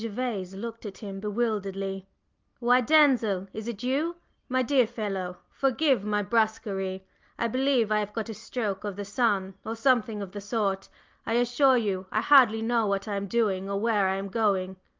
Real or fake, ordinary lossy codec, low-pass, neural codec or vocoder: real; Opus, 32 kbps; 7.2 kHz; none